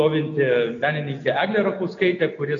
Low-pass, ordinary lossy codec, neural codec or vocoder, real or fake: 7.2 kHz; AAC, 48 kbps; none; real